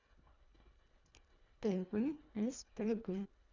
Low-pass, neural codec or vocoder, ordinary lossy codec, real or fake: 7.2 kHz; codec, 24 kHz, 1.5 kbps, HILCodec; none; fake